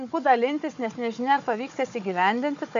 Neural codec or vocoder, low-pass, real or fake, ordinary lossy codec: codec, 16 kHz, 4 kbps, FunCodec, trained on Chinese and English, 50 frames a second; 7.2 kHz; fake; MP3, 64 kbps